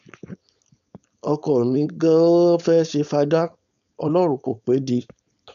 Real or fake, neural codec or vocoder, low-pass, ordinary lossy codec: fake; codec, 16 kHz, 4.8 kbps, FACodec; 7.2 kHz; none